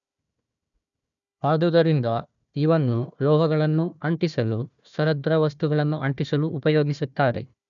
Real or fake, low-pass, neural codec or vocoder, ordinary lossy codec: fake; 7.2 kHz; codec, 16 kHz, 1 kbps, FunCodec, trained on Chinese and English, 50 frames a second; none